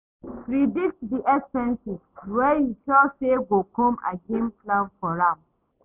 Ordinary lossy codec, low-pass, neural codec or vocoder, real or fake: none; 3.6 kHz; none; real